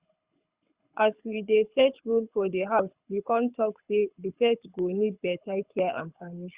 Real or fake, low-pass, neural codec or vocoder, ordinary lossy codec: fake; 3.6 kHz; codec, 24 kHz, 6 kbps, HILCodec; Opus, 64 kbps